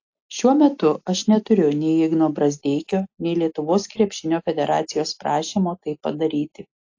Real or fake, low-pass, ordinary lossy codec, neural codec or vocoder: real; 7.2 kHz; AAC, 48 kbps; none